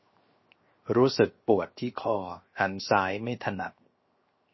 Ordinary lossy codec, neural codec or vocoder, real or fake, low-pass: MP3, 24 kbps; codec, 16 kHz, 0.7 kbps, FocalCodec; fake; 7.2 kHz